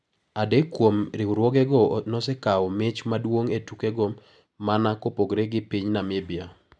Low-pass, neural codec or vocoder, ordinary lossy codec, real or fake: none; none; none; real